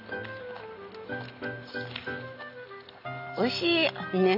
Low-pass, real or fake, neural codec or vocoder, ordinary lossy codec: 5.4 kHz; real; none; none